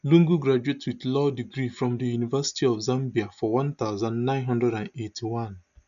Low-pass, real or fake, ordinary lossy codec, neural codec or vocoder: 7.2 kHz; real; none; none